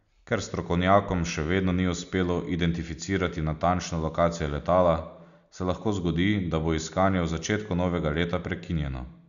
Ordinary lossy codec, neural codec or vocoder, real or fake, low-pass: none; none; real; 7.2 kHz